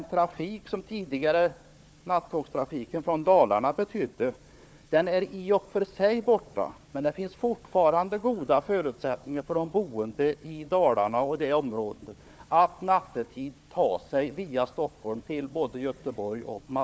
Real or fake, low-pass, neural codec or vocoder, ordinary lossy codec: fake; none; codec, 16 kHz, 4 kbps, FunCodec, trained on Chinese and English, 50 frames a second; none